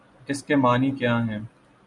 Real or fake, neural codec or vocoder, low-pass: real; none; 10.8 kHz